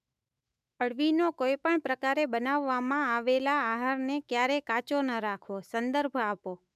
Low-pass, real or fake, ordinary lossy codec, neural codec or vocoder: 14.4 kHz; fake; none; autoencoder, 48 kHz, 128 numbers a frame, DAC-VAE, trained on Japanese speech